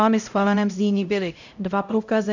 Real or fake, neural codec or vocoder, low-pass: fake; codec, 16 kHz, 0.5 kbps, X-Codec, HuBERT features, trained on LibriSpeech; 7.2 kHz